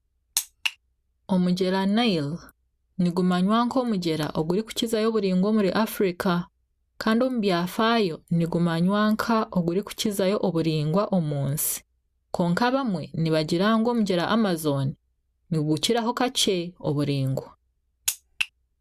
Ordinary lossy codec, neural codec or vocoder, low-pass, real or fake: Opus, 64 kbps; none; 14.4 kHz; real